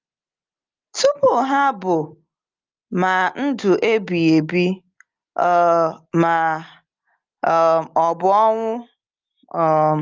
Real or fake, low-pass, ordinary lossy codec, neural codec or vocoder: real; 7.2 kHz; Opus, 32 kbps; none